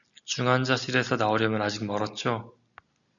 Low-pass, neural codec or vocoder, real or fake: 7.2 kHz; none; real